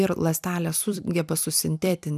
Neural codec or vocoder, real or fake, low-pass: vocoder, 44.1 kHz, 128 mel bands every 256 samples, BigVGAN v2; fake; 14.4 kHz